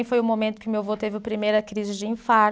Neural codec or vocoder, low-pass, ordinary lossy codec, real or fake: none; none; none; real